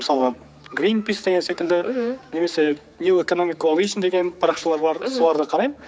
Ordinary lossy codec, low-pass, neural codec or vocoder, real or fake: none; none; codec, 16 kHz, 4 kbps, X-Codec, HuBERT features, trained on general audio; fake